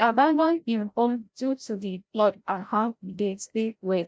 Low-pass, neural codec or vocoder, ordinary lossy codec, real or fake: none; codec, 16 kHz, 0.5 kbps, FreqCodec, larger model; none; fake